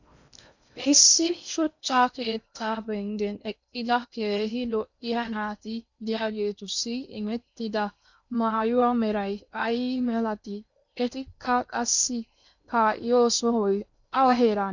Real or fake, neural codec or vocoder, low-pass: fake; codec, 16 kHz in and 24 kHz out, 0.6 kbps, FocalCodec, streaming, 4096 codes; 7.2 kHz